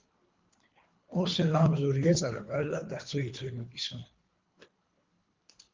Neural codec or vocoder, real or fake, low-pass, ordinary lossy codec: codec, 24 kHz, 3 kbps, HILCodec; fake; 7.2 kHz; Opus, 32 kbps